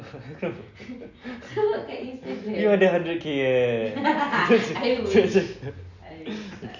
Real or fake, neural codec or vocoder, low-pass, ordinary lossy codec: real; none; 7.2 kHz; none